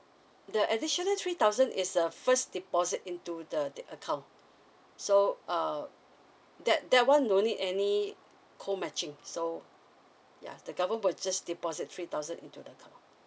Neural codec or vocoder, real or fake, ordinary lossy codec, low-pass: none; real; none; none